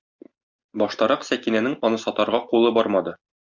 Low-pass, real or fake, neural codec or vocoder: 7.2 kHz; real; none